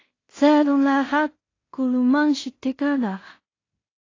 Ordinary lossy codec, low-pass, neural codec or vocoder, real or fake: AAC, 32 kbps; 7.2 kHz; codec, 16 kHz in and 24 kHz out, 0.4 kbps, LongCat-Audio-Codec, two codebook decoder; fake